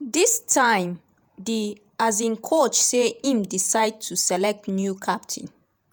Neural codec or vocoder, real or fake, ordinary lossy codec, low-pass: vocoder, 48 kHz, 128 mel bands, Vocos; fake; none; none